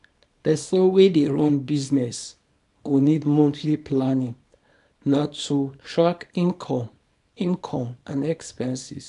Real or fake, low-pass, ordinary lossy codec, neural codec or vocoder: fake; 10.8 kHz; AAC, 96 kbps; codec, 24 kHz, 0.9 kbps, WavTokenizer, small release